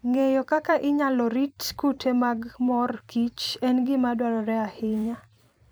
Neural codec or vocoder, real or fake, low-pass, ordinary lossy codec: vocoder, 44.1 kHz, 128 mel bands every 256 samples, BigVGAN v2; fake; none; none